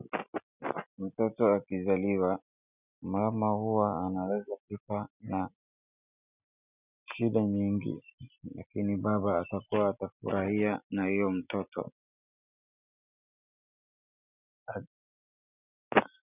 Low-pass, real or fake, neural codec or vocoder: 3.6 kHz; real; none